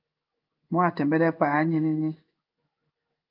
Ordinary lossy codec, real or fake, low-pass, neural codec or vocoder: Opus, 32 kbps; fake; 5.4 kHz; codec, 16 kHz in and 24 kHz out, 1 kbps, XY-Tokenizer